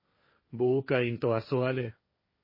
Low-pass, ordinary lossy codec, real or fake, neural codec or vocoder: 5.4 kHz; MP3, 24 kbps; fake; codec, 16 kHz, 1.1 kbps, Voila-Tokenizer